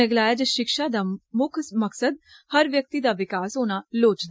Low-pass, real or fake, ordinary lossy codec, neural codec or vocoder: none; real; none; none